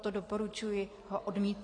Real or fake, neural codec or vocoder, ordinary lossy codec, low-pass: real; none; AAC, 32 kbps; 9.9 kHz